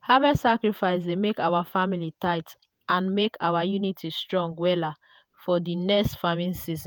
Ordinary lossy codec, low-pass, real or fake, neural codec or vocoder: none; none; fake; vocoder, 48 kHz, 128 mel bands, Vocos